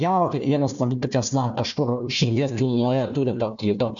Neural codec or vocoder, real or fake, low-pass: codec, 16 kHz, 1 kbps, FunCodec, trained on Chinese and English, 50 frames a second; fake; 7.2 kHz